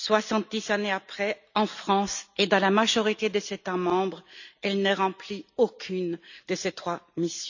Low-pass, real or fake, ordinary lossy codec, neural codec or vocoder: 7.2 kHz; real; none; none